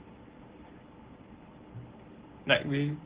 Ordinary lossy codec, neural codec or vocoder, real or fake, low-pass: Opus, 16 kbps; none; real; 3.6 kHz